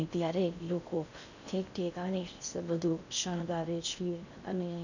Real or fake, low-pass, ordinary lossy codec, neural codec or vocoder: fake; 7.2 kHz; none; codec, 16 kHz in and 24 kHz out, 0.6 kbps, FocalCodec, streaming, 4096 codes